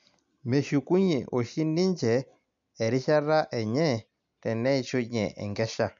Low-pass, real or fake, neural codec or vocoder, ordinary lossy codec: 7.2 kHz; real; none; MP3, 64 kbps